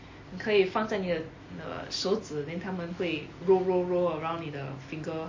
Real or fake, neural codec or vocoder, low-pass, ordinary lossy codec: real; none; 7.2 kHz; MP3, 32 kbps